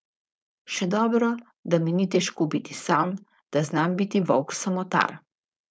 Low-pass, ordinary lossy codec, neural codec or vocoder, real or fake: none; none; codec, 16 kHz, 4.8 kbps, FACodec; fake